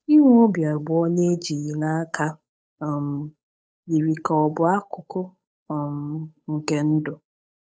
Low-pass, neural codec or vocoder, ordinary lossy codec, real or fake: none; codec, 16 kHz, 8 kbps, FunCodec, trained on Chinese and English, 25 frames a second; none; fake